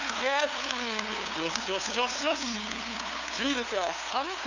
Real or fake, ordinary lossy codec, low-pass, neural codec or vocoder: fake; none; 7.2 kHz; codec, 16 kHz, 2 kbps, FunCodec, trained on LibriTTS, 25 frames a second